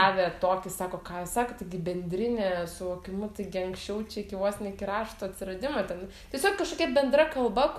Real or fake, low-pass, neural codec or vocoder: real; 14.4 kHz; none